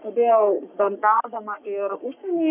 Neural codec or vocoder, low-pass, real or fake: codec, 44.1 kHz, 3.4 kbps, Pupu-Codec; 3.6 kHz; fake